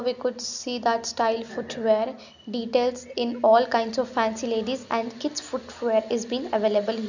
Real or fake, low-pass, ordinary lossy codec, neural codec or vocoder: real; 7.2 kHz; none; none